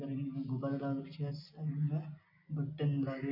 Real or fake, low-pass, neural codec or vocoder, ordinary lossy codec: real; 5.4 kHz; none; none